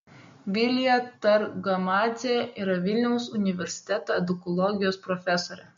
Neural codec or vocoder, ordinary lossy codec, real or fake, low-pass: none; MP3, 48 kbps; real; 7.2 kHz